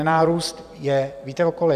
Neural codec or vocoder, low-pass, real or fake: vocoder, 44.1 kHz, 128 mel bands every 256 samples, BigVGAN v2; 14.4 kHz; fake